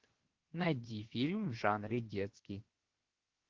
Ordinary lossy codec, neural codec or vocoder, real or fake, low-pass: Opus, 16 kbps; codec, 16 kHz, 0.7 kbps, FocalCodec; fake; 7.2 kHz